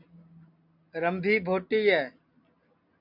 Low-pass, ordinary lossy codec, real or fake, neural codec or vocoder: 5.4 kHz; MP3, 48 kbps; real; none